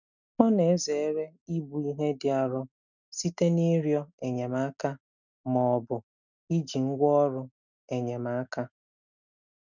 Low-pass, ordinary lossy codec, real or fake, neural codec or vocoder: 7.2 kHz; none; real; none